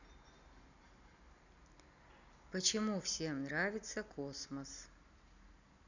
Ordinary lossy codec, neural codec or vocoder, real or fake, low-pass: none; none; real; 7.2 kHz